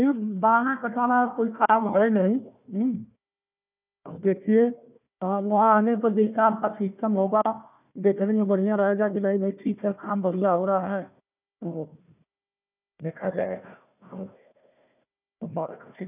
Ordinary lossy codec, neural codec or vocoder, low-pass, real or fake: none; codec, 16 kHz, 1 kbps, FunCodec, trained on Chinese and English, 50 frames a second; 3.6 kHz; fake